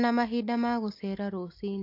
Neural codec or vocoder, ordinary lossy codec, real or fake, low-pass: none; AAC, 32 kbps; real; 5.4 kHz